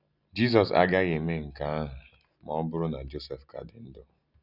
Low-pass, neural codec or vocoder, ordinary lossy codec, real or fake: 5.4 kHz; none; none; real